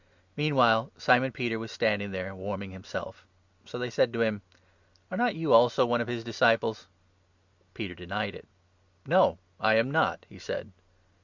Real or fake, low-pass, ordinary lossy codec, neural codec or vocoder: real; 7.2 kHz; Opus, 64 kbps; none